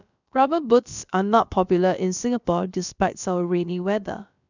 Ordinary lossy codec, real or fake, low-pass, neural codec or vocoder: none; fake; 7.2 kHz; codec, 16 kHz, about 1 kbps, DyCAST, with the encoder's durations